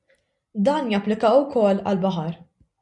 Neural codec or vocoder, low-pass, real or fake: none; 10.8 kHz; real